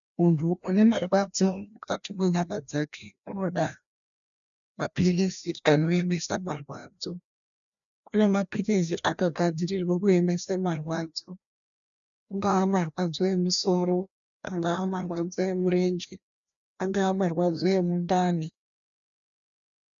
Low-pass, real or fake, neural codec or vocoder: 7.2 kHz; fake; codec, 16 kHz, 1 kbps, FreqCodec, larger model